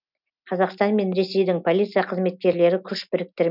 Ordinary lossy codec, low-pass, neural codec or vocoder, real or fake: none; 5.4 kHz; none; real